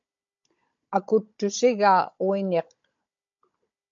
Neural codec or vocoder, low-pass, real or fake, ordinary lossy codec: codec, 16 kHz, 16 kbps, FunCodec, trained on Chinese and English, 50 frames a second; 7.2 kHz; fake; MP3, 48 kbps